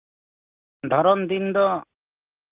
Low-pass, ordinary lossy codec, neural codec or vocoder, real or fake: 3.6 kHz; Opus, 32 kbps; none; real